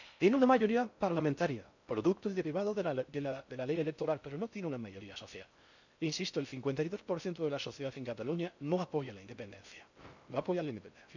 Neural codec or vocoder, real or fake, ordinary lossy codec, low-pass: codec, 16 kHz in and 24 kHz out, 0.6 kbps, FocalCodec, streaming, 4096 codes; fake; none; 7.2 kHz